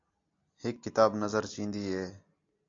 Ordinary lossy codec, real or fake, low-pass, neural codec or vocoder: AAC, 48 kbps; real; 7.2 kHz; none